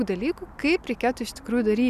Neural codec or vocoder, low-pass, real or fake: none; 14.4 kHz; real